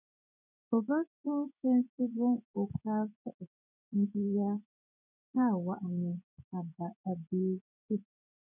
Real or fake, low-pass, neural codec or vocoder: fake; 3.6 kHz; vocoder, 24 kHz, 100 mel bands, Vocos